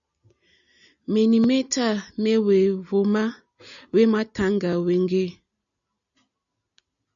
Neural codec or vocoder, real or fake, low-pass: none; real; 7.2 kHz